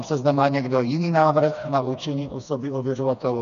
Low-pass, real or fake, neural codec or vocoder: 7.2 kHz; fake; codec, 16 kHz, 2 kbps, FreqCodec, smaller model